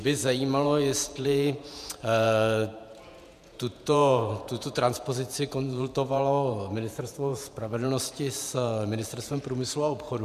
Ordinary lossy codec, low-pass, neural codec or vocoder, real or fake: MP3, 96 kbps; 14.4 kHz; vocoder, 48 kHz, 128 mel bands, Vocos; fake